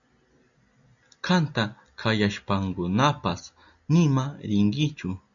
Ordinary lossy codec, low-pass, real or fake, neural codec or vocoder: MP3, 96 kbps; 7.2 kHz; real; none